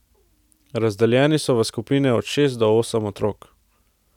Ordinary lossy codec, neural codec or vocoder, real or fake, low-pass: none; none; real; 19.8 kHz